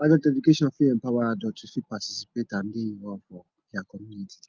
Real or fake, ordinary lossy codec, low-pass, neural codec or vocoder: real; Opus, 32 kbps; 7.2 kHz; none